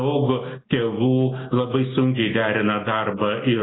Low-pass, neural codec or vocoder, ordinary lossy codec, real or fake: 7.2 kHz; none; AAC, 16 kbps; real